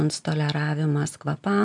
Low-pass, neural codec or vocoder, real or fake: 10.8 kHz; none; real